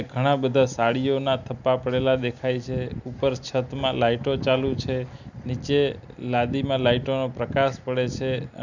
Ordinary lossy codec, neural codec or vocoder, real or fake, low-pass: none; none; real; 7.2 kHz